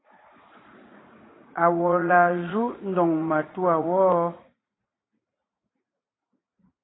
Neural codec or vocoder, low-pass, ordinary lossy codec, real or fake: vocoder, 44.1 kHz, 80 mel bands, Vocos; 7.2 kHz; AAC, 16 kbps; fake